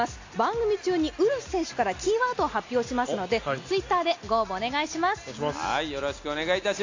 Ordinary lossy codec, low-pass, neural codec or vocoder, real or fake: AAC, 48 kbps; 7.2 kHz; none; real